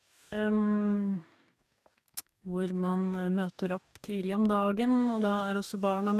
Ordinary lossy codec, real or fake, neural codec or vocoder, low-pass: none; fake; codec, 44.1 kHz, 2.6 kbps, DAC; 14.4 kHz